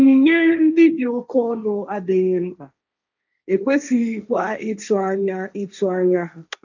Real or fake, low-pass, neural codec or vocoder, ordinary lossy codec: fake; 7.2 kHz; codec, 16 kHz, 1.1 kbps, Voila-Tokenizer; none